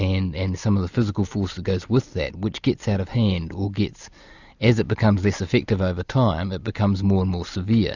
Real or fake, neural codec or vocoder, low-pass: real; none; 7.2 kHz